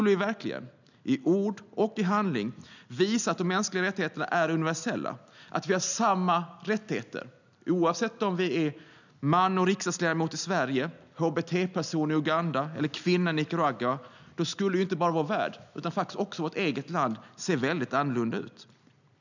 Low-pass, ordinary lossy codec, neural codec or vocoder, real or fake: 7.2 kHz; none; none; real